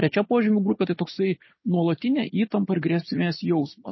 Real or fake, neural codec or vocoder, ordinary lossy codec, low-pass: real; none; MP3, 24 kbps; 7.2 kHz